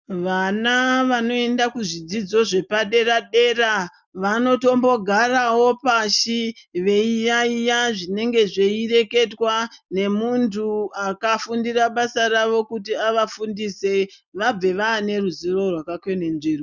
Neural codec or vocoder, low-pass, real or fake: none; 7.2 kHz; real